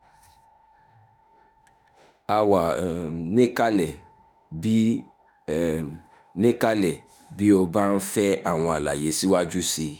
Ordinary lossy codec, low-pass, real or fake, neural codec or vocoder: none; none; fake; autoencoder, 48 kHz, 32 numbers a frame, DAC-VAE, trained on Japanese speech